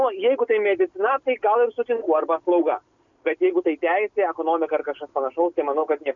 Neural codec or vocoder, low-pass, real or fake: none; 7.2 kHz; real